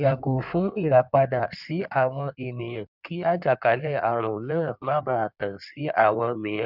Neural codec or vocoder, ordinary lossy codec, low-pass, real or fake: codec, 16 kHz in and 24 kHz out, 1.1 kbps, FireRedTTS-2 codec; none; 5.4 kHz; fake